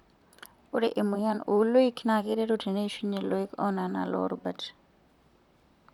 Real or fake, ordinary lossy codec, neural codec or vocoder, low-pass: fake; none; vocoder, 44.1 kHz, 128 mel bands, Pupu-Vocoder; 19.8 kHz